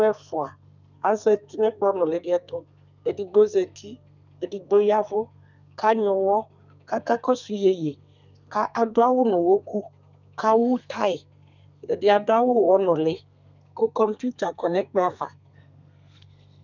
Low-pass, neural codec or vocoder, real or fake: 7.2 kHz; codec, 32 kHz, 1.9 kbps, SNAC; fake